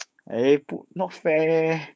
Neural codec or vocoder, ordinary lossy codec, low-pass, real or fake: codec, 16 kHz, 6 kbps, DAC; none; none; fake